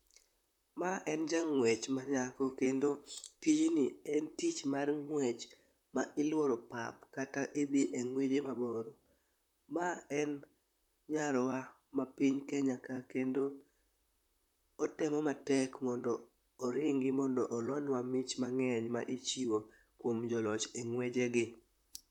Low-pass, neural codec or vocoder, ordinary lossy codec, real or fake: 19.8 kHz; vocoder, 44.1 kHz, 128 mel bands, Pupu-Vocoder; none; fake